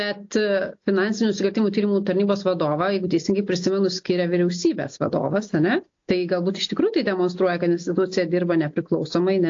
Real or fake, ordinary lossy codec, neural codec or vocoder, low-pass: real; AAC, 48 kbps; none; 7.2 kHz